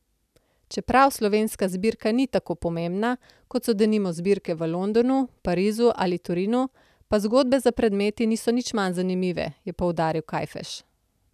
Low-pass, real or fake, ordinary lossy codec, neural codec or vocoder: 14.4 kHz; real; none; none